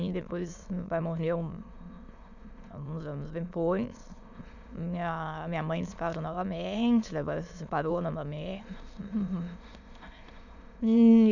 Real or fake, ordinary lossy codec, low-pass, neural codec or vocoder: fake; none; 7.2 kHz; autoencoder, 22.05 kHz, a latent of 192 numbers a frame, VITS, trained on many speakers